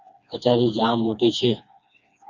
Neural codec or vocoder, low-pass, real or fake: codec, 16 kHz, 2 kbps, FreqCodec, smaller model; 7.2 kHz; fake